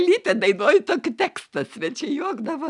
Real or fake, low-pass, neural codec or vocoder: real; 10.8 kHz; none